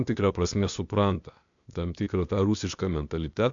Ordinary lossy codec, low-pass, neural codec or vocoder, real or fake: MP3, 64 kbps; 7.2 kHz; codec, 16 kHz, 0.8 kbps, ZipCodec; fake